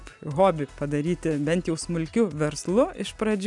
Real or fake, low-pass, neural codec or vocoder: real; 10.8 kHz; none